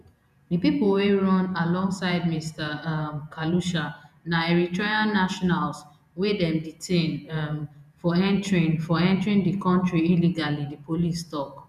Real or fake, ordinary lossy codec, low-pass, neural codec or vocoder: fake; none; 14.4 kHz; vocoder, 48 kHz, 128 mel bands, Vocos